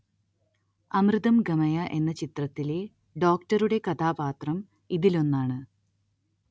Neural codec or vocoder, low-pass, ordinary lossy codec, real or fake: none; none; none; real